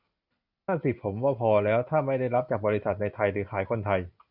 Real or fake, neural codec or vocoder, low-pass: real; none; 5.4 kHz